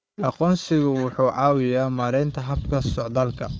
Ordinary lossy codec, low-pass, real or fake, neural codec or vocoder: none; none; fake; codec, 16 kHz, 4 kbps, FunCodec, trained on Chinese and English, 50 frames a second